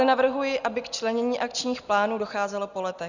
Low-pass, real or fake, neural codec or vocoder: 7.2 kHz; real; none